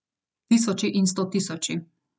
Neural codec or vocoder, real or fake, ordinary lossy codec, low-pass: none; real; none; none